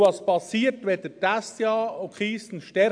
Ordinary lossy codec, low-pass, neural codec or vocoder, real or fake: AAC, 64 kbps; 9.9 kHz; none; real